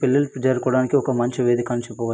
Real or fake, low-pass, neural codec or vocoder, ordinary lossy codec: real; none; none; none